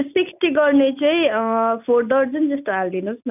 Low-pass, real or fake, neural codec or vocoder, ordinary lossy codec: 3.6 kHz; real; none; none